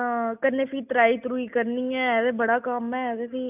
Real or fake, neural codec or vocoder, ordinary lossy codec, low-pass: real; none; none; 3.6 kHz